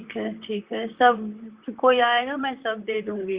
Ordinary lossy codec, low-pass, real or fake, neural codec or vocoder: Opus, 64 kbps; 3.6 kHz; fake; vocoder, 44.1 kHz, 128 mel bands, Pupu-Vocoder